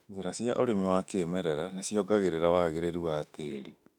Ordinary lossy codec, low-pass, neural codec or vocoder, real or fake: none; 19.8 kHz; autoencoder, 48 kHz, 32 numbers a frame, DAC-VAE, trained on Japanese speech; fake